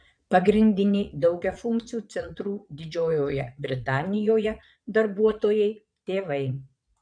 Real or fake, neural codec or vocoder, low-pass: fake; vocoder, 22.05 kHz, 80 mel bands, Vocos; 9.9 kHz